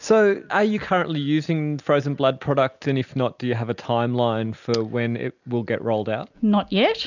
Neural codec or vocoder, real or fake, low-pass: none; real; 7.2 kHz